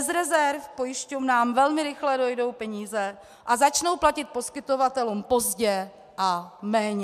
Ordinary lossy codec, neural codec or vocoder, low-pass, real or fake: MP3, 96 kbps; none; 14.4 kHz; real